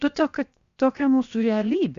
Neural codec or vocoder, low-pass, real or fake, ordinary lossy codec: codec, 16 kHz, 0.7 kbps, FocalCodec; 7.2 kHz; fake; Opus, 64 kbps